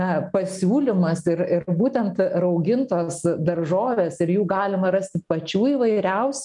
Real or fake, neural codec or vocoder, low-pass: real; none; 10.8 kHz